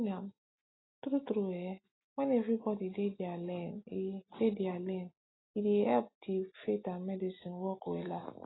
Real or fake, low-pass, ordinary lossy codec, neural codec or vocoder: real; 7.2 kHz; AAC, 16 kbps; none